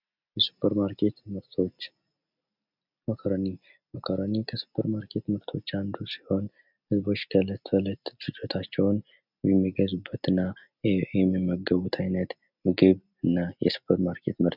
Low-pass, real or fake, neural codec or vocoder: 5.4 kHz; real; none